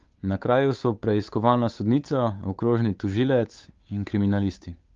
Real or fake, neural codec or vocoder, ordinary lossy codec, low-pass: fake; codec, 16 kHz, 8 kbps, FunCodec, trained on Chinese and English, 25 frames a second; Opus, 32 kbps; 7.2 kHz